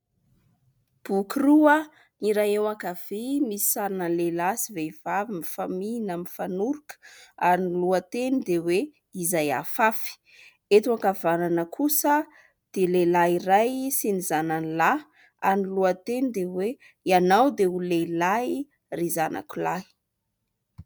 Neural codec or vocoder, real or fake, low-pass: none; real; 19.8 kHz